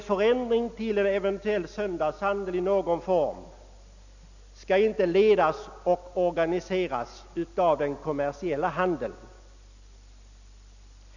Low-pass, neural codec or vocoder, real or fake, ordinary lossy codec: 7.2 kHz; none; real; none